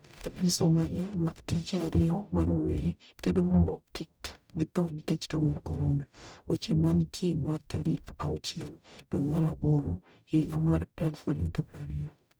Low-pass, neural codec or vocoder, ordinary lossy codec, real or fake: none; codec, 44.1 kHz, 0.9 kbps, DAC; none; fake